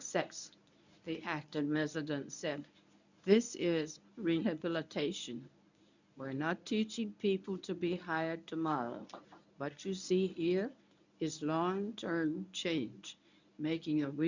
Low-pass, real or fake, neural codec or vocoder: 7.2 kHz; fake; codec, 24 kHz, 0.9 kbps, WavTokenizer, medium speech release version 1